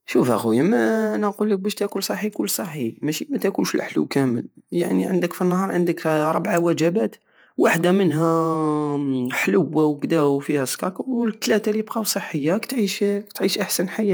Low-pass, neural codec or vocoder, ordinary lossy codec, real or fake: none; vocoder, 48 kHz, 128 mel bands, Vocos; none; fake